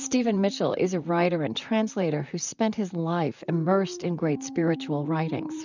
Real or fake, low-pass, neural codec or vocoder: fake; 7.2 kHz; vocoder, 44.1 kHz, 128 mel bands, Pupu-Vocoder